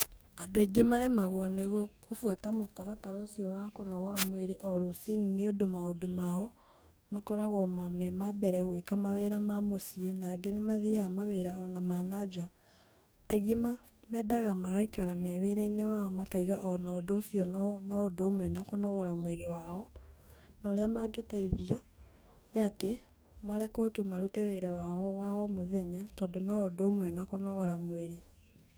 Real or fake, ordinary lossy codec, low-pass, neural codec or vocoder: fake; none; none; codec, 44.1 kHz, 2.6 kbps, DAC